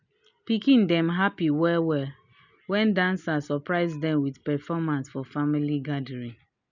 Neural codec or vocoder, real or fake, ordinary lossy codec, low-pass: none; real; none; 7.2 kHz